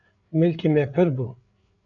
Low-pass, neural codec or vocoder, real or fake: 7.2 kHz; codec, 16 kHz, 8 kbps, FreqCodec, larger model; fake